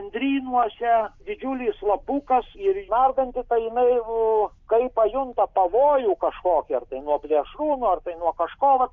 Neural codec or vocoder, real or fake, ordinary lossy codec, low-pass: none; real; MP3, 64 kbps; 7.2 kHz